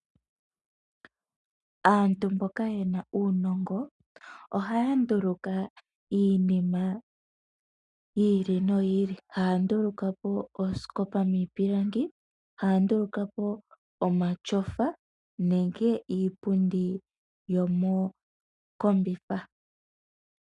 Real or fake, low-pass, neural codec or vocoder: real; 10.8 kHz; none